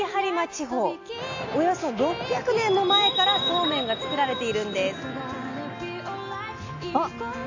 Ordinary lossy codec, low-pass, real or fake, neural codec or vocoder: AAC, 48 kbps; 7.2 kHz; real; none